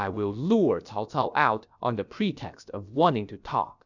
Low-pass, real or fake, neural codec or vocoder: 7.2 kHz; fake; codec, 16 kHz, about 1 kbps, DyCAST, with the encoder's durations